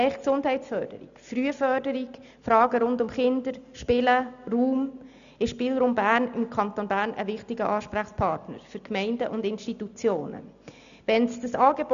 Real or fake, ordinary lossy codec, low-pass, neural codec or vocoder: real; none; 7.2 kHz; none